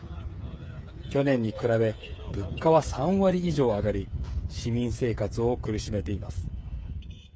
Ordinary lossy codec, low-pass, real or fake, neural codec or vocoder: none; none; fake; codec, 16 kHz, 8 kbps, FreqCodec, smaller model